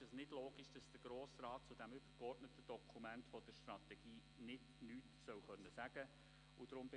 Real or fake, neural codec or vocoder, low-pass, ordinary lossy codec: real; none; 9.9 kHz; none